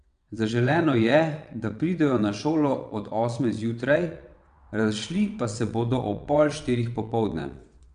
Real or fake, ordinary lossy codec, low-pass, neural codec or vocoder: fake; none; 9.9 kHz; vocoder, 22.05 kHz, 80 mel bands, WaveNeXt